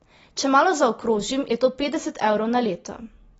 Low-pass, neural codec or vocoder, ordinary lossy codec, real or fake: 19.8 kHz; none; AAC, 24 kbps; real